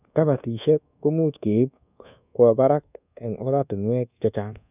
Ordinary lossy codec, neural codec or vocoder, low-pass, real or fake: none; codec, 24 kHz, 1.2 kbps, DualCodec; 3.6 kHz; fake